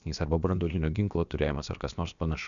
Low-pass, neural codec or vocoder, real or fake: 7.2 kHz; codec, 16 kHz, about 1 kbps, DyCAST, with the encoder's durations; fake